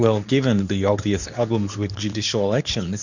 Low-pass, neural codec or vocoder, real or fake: 7.2 kHz; codec, 24 kHz, 0.9 kbps, WavTokenizer, medium speech release version 2; fake